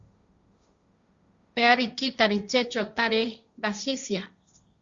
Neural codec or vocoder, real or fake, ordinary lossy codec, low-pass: codec, 16 kHz, 1.1 kbps, Voila-Tokenizer; fake; Opus, 64 kbps; 7.2 kHz